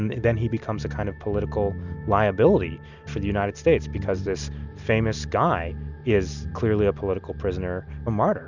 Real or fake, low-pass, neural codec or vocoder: real; 7.2 kHz; none